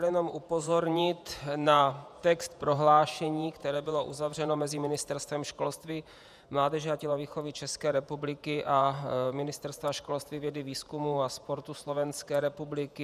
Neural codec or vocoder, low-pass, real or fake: vocoder, 48 kHz, 128 mel bands, Vocos; 14.4 kHz; fake